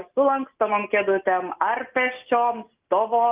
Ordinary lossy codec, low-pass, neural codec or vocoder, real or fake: Opus, 32 kbps; 3.6 kHz; none; real